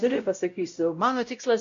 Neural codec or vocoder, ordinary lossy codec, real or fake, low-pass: codec, 16 kHz, 0.5 kbps, X-Codec, WavLM features, trained on Multilingual LibriSpeech; MP3, 48 kbps; fake; 7.2 kHz